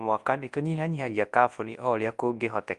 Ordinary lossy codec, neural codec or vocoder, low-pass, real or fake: Opus, 32 kbps; codec, 24 kHz, 0.9 kbps, WavTokenizer, large speech release; 10.8 kHz; fake